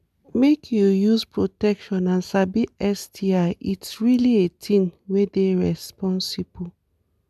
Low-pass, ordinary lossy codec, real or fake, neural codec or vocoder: 14.4 kHz; MP3, 96 kbps; real; none